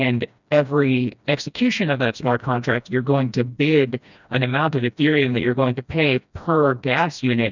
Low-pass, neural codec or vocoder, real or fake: 7.2 kHz; codec, 16 kHz, 1 kbps, FreqCodec, smaller model; fake